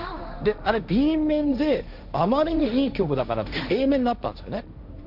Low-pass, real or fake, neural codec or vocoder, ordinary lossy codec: 5.4 kHz; fake; codec, 16 kHz, 1.1 kbps, Voila-Tokenizer; none